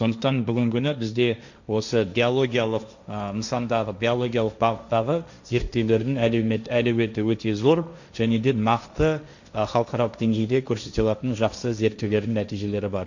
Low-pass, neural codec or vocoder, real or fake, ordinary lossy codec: 7.2 kHz; codec, 16 kHz, 1.1 kbps, Voila-Tokenizer; fake; none